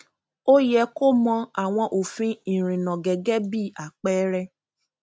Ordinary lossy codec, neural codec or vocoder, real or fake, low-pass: none; none; real; none